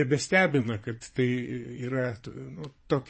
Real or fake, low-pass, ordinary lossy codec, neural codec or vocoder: fake; 9.9 kHz; MP3, 32 kbps; vocoder, 22.05 kHz, 80 mel bands, Vocos